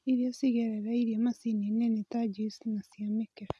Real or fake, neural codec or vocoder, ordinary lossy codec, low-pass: real; none; none; none